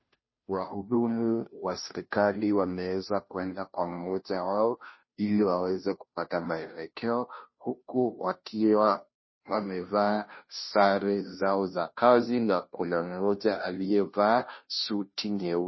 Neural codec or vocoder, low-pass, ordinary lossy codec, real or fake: codec, 16 kHz, 0.5 kbps, FunCodec, trained on Chinese and English, 25 frames a second; 7.2 kHz; MP3, 24 kbps; fake